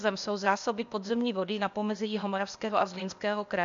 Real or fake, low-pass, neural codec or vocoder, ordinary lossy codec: fake; 7.2 kHz; codec, 16 kHz, 0.8 kbps, ZipCodec; MP3, 64 kbps